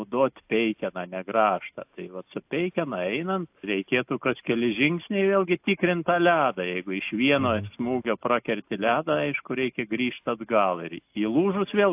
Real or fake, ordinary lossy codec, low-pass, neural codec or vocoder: real; AAC, 32 kbps; 3.6 kHz; none